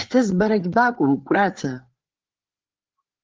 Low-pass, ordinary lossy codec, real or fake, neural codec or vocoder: 7.2 kHz; Opus, 16 kbps; fake; codec, 16 kHz, 4 kbps, FreqCodec, larger model